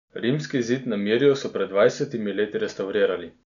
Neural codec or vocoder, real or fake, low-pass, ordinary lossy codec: none; real; 7.2 kHz; none